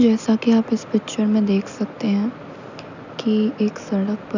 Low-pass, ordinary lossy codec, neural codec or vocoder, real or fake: 7.2 kHz; none; none; real